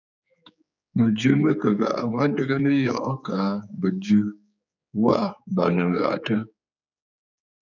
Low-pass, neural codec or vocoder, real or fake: 7.2 kHz; codec, 16 kHz, 4 kbps, X-Codec, HuBERT features, trained on general audio; fake